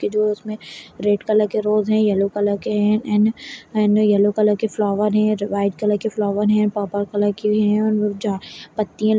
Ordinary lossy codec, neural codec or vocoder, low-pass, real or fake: none; none; none; real